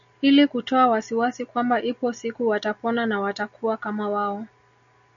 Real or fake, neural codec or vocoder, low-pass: real; none; 7.2 kHz